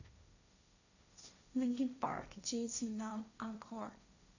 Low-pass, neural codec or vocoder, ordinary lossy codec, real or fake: 7.2 kHz; codec, 16 kHz, 1.1 kbps, Voila-Tokenizer; none; fake